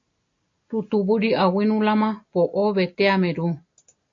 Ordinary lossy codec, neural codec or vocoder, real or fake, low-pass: AAC, 48 kbps; none; real; 7.2 kHz